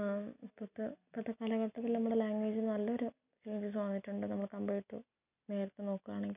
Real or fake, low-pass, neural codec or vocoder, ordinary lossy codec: real; 3.6 kHz; none; none